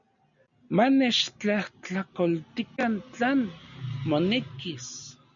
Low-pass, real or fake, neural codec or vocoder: 7.2 kHz; real; none